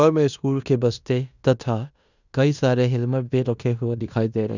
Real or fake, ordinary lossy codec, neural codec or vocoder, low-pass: fake; none; codec, 16 kHz in and 24 kHz out, 0.9 kbps, LongCat-Audio-Codec, fine tuned four codebook decoder; 7.2 kHz